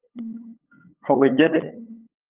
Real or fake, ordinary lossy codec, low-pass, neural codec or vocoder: fake; Opus, 32 kbps; 3.6 kHz; codec, 16 kHz, 8 kbps, FunCodec, trained on LibriTTS, 25 frames a second